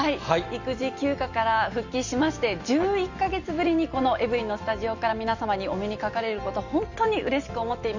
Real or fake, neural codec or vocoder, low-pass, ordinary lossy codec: real; none; 7.2 kHz; Opus, 64 kbps